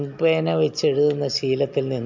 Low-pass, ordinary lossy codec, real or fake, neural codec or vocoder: 7.2 kHz; MP3, 64 kbps; real; none